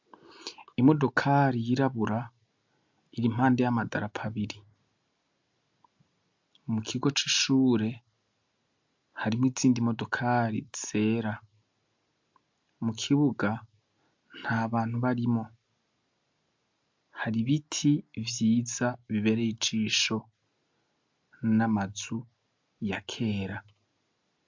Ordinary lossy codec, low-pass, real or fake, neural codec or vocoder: MP3, 64 kbps; 7.2 kHz; real; none